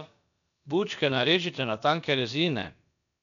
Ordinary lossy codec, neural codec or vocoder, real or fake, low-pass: none; codec, 16 kHz, about 1 kbps, DyCAST, with the encoder's durations; fake; 7.2 kHz